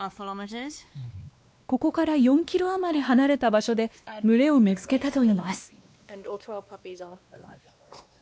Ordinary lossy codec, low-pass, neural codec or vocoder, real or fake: none; none; codec, 16 kHz, 2 kbps, X-Codec, WavLM features, trained on Multilingual LibriSpeech; fake